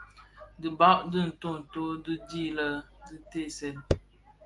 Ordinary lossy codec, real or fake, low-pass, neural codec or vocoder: Opus, 32 kbps; real; 10.8 kHz; none